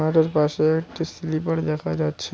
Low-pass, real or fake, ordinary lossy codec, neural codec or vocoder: none; real; none; none